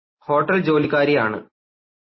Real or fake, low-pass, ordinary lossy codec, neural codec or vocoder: real; 7.2 kHz; MP3, 24 kbps; none